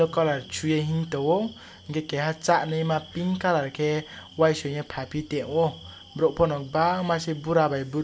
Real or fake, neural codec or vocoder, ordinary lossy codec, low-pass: real; none; none; none